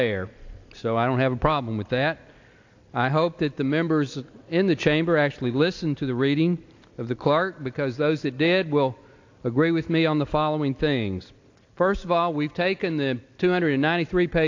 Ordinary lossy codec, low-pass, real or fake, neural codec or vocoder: AAC, 48 kbps; 7.2 kHz; real; none